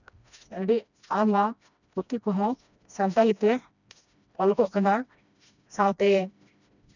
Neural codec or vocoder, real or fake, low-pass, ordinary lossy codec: codec, 16 kHz, 1 kbps, FreqCodec, smaller model; fake; 7.2 kHz; none